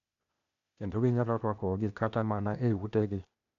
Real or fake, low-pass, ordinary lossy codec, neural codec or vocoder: fake; 7.2 kHz; none; codec, 16 kHz, 0.8 kbps, ZipCodec